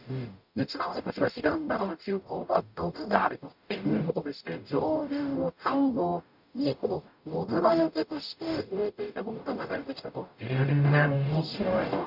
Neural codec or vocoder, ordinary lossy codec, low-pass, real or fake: codec, 44.1 kHz, 0.9 kbps, DAC; none; 5.4 kHz; fake